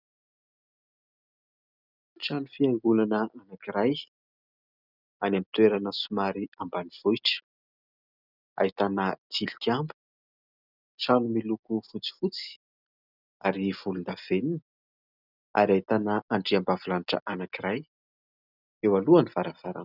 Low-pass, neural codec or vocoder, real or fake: 5.4 kHz; none; real